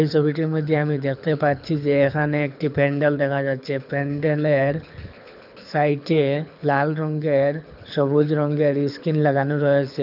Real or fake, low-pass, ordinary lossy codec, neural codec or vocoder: fake; 5.4 kHz; none; codec, 24 kHz, 6 kbps, HILCodec